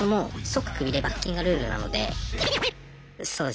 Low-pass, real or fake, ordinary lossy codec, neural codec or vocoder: none; real; none; none